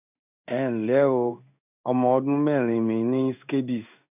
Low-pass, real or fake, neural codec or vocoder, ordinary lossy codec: 3.6 kHz; fake; codec, 16 kHz in and 24 kHz out, 1 kbps, XY-Tokenizer; none